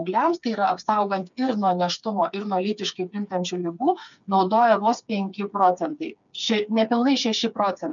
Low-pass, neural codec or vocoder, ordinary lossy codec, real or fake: 7.2 kHz; codec, 16 kHz, 4 kbps, FreqCodec, smaller model; MP3, 96 kbps; fake